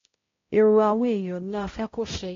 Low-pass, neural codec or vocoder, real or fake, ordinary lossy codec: 7.2 kHz; codec, 16 kHz, 0.5 kbps, X-Codec, HuBERT features, trained on balanced general audio; fake; AAC, 32 kbps